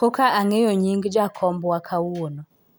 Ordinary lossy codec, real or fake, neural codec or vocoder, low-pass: none; real; none; none